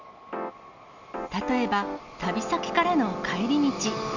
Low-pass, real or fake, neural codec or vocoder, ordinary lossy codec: 7.2 kHz; real; none; none